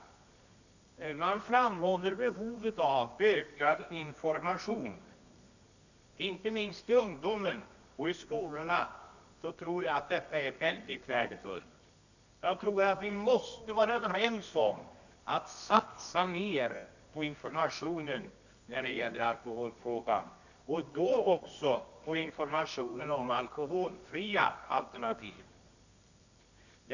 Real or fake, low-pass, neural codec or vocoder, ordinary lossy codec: fake; 7.2 kHz; codec, 24 kHz, 0.9 kbps, WavTokenizer, medium music audio release; none